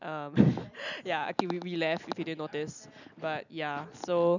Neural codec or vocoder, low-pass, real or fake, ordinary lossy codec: none; 7.2 kHz; real; none